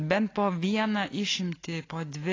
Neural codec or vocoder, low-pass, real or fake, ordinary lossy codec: none; 7.2 kHz; real; AAC, 32 kbps